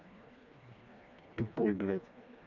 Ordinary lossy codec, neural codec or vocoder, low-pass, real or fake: none; codec, 16 kHz, 2 kbps, FreqCodec, smaller model; 7.2 kHz; fake